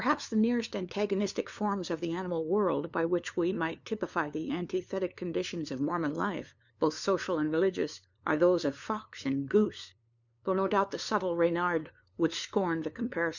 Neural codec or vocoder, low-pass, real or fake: codec, 16 kHz, 2 kbps, FunCodec, trained on LibriTTS, 25 frames a second; 7.2 kHz; fake